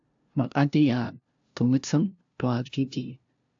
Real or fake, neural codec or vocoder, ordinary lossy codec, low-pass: fake; codec, 16 kHz, 0.5 kbps, FunCodec, trained on LibriTTS, 25 frames a second; AAC, 64 kbps; 7.2 kHz